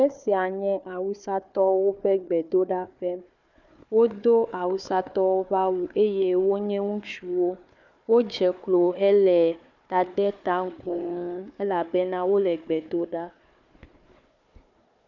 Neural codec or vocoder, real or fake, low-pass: codec, 16 kHz, 4 kbps, FunCodec, trained on Chinese and English, 50 frames a second; fake; 7.2 kHz